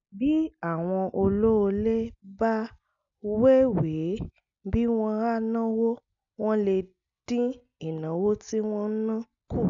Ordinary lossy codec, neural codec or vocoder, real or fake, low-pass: none; none; real; 7.2 kHz